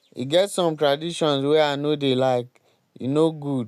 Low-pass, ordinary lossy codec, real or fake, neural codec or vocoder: 14.4 kHz; none; real; none